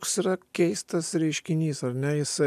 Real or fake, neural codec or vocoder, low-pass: real; none; 14.4 kHz